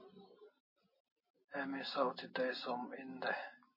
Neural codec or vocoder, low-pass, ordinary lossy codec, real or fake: none; 5.4 kHz; MP3, 24 kbps; real